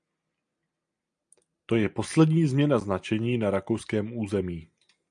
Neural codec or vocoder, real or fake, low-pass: none; real; 9.9 kHz